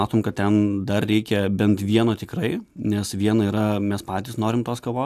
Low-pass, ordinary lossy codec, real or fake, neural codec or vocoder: 14.4 kHz; AAC, 96 kbps; real; none